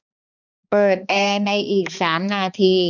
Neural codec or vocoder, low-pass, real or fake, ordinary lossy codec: codec, 16 kHz, 2 kbps, X-Codec, HuBERT features, trained on balanced general audio; 7.2 kHz; fake; none